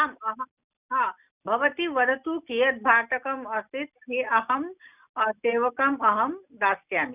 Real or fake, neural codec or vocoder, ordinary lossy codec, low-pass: real; none; none; 3.6 kHz